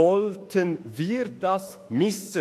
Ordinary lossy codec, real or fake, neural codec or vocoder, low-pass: AAC, 64 kbps; fake; autoencoder, 48 kHz, 32 numbers a frame, DAC-VAE, trained on Japanese speech; 14.4 kHz